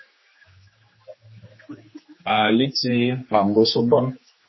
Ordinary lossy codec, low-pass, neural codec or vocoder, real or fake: MP3, 24 kbps; 7.2 kHz; codec, 16 kHz, 2 kbps, X-Codec, HuBERT features, trained on general audio; fake